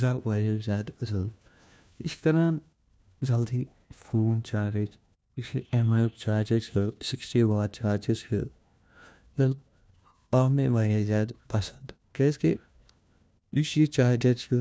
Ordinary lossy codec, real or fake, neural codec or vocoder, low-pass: none; fake; codec, 16 kHz, 1 kbps, FunCodec, trained on LibriTTS, 50 frames a second; none